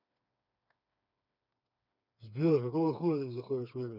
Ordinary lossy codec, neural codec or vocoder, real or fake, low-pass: none; codec, 16 kHz, 4 kbps, FreqCodec, smaller model; fake; 5.4 kHz